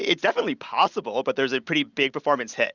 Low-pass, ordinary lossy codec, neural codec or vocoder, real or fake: 7.2 kHz; Opus, 64 kbps; none; real